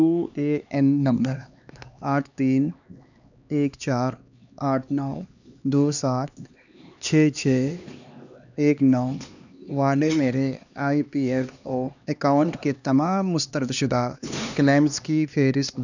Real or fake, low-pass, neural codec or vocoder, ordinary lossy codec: fake; 7.2 kHz; codec, 16 kHz, 2 kbps, X-Codec, HuBERT features, trained on LibriSpeech; none